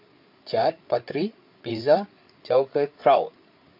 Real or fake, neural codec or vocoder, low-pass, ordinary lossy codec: fake; codec, 16 kHz, 8 kbps, FreqCodec, larger model; 5.4 kHz; MP3, 48 kbps